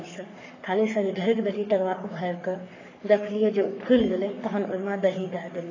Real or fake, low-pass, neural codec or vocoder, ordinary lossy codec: fake; 7.2 kHz; codec, 44.1 kHz, 3.4 kbps, Pupu-Codec; AAC, 32 kbps